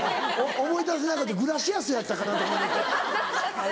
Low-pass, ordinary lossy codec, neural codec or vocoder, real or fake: none; none; none; real